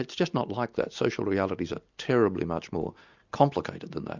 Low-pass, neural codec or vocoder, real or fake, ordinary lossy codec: 7.2 kHz; none; real; Opus, 64 kbps